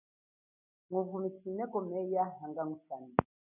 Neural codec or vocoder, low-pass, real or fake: none; 3.6 kHz; real